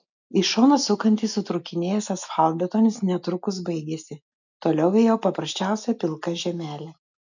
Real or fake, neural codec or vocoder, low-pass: real; none; 7.2 kHz